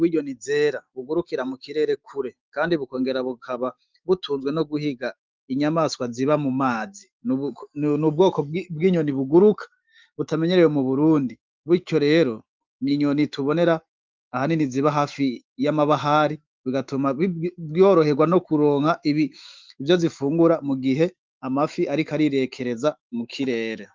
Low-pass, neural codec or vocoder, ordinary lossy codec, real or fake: 7.2 kHz; autoencoder, 48 kHz, 128 numbers a frame, DAC-VAE, trained on Japanese speech; Opus, 32 kbps; fake